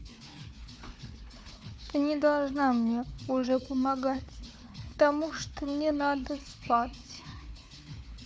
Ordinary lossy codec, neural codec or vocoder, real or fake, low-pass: none; codec, 16 kHz, 4 kbps, FunCodec, trained on Chinese and English, 50 frames a second; fake; none